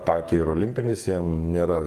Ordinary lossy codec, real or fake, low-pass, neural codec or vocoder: Opus, 32 kbps; fake; 14.4 kHz; codec, 44.1 kHz, 2.6 kbps, SNAC